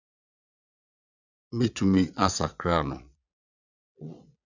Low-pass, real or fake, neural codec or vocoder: 7.2 kHz; fake; vocoder, 22.05 kHz, 80 mel bands, Vocos